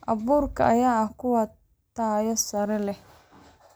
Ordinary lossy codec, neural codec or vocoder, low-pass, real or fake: none; none; none; real